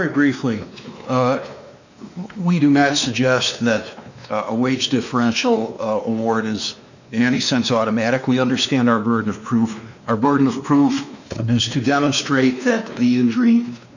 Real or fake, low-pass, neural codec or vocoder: fake; 7.2 kHz; codec, 16 kHz, 2 kbps, X-Codec, WavLM features, trained on Multilingual LibriSpeech